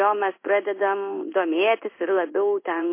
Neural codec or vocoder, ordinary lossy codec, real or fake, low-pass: codec, 16 kHz in and 24 kHz out, 1 kbps, XY-Tokenizer; MP3, 24 kbps; fake; 3.6 kHz